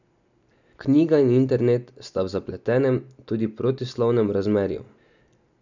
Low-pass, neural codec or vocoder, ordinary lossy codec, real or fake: 7.2 kHz; none; none; real